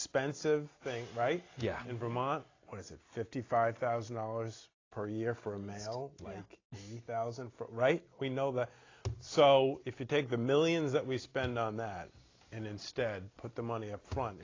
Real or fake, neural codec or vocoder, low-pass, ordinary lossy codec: real; none; 7.2 kHz; AAC, 32 kbps